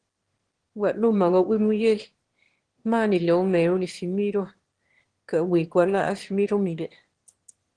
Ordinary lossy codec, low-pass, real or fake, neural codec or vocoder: Opus, 16 kbps; 9.9 kHz; fake; autoencoder, 22.05 kHz, a latent of 192 numbers a frame, VITS, trained on one speaker